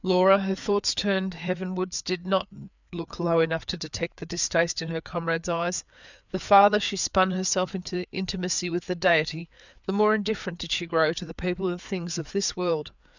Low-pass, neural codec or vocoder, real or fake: 7.2 kHz; codec, 16 kHz, 4 kbps, FreqCodec, larger model; fake